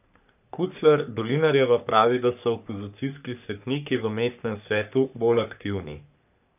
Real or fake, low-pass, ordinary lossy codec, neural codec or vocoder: fake; 3.6 kHz; none; codec, 44.1 kHz, 3.4 kbps, Pupu-Codec